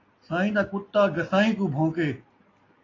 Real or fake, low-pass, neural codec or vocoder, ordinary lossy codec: real; 7.2 kHz; none; AAC, 32 kbps